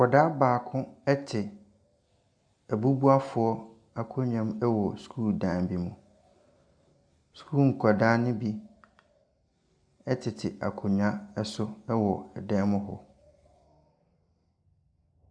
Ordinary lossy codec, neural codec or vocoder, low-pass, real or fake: MP3, 96 kbps; none; 9.9 kHz; real